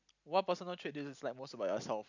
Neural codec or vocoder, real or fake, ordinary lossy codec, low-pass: none; real; none; 7.2 kHz